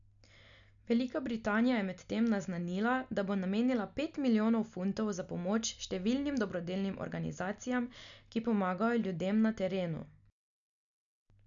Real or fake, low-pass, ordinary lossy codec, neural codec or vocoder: real; 7.2 kHz; none; none